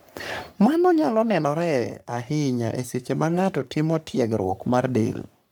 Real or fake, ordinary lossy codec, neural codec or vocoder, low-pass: fake; none; codec, 44.1 kHz, 3.4 kbps, Pupu-Codec; none